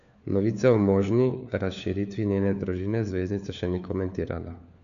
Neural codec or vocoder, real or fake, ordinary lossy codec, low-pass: codec, 16 kHz, 4 kbps, FunCodec, trained on LibriTTS, 50 frames a second; fake; none; 7.2 kHz